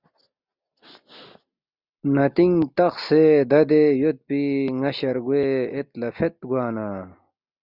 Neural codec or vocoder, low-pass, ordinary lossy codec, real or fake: none; 5.4 kHz; Opus, 64 kbps; real